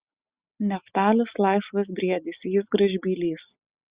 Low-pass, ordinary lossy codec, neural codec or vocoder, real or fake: 3.6 kHz; Opus, 64 kbps; none; real